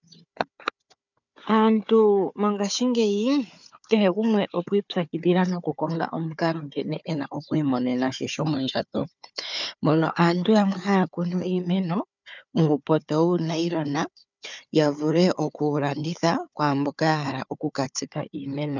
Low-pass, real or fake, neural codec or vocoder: 7.2 kHz; fake; codec, 16 kHz, 4 kbps, FunCodec, trained on Chinese and English, 50 frames a second